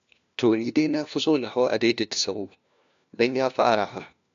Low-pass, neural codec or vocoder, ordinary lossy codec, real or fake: 7.2 kHz; codec, 16 kHz, 1 kbps, FunCodec, trained on LibriTTS, 50 frames a second; AAC, 64 kbps; fake